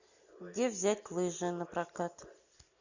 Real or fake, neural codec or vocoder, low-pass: real; none; 7.2 kHz